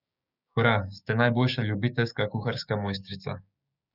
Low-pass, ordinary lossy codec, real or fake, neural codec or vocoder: 5.4 kHz; none; fake; codec, 16 kHz, 6 kbps, DAC